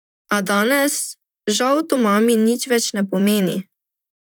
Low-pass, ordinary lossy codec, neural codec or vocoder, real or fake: none; none; vocoder, 44.1 kHz, 128 mel bands, Pupu-Vocoder; fake